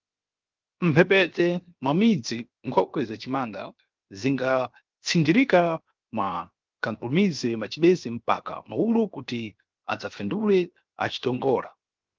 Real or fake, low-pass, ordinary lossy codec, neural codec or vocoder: fake; 7.2 kHz; Opus, 32 kbps; codec, 16 kHz, 0.7 kbps, FocalCodec